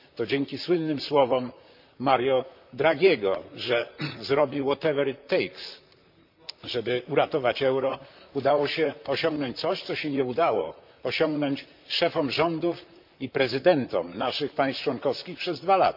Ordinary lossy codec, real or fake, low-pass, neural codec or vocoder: MP3, 48 kbps; fake; 5.4 kHz; vocoder, 44.1 kHz, 128 mel bands, Pupu-Vocoder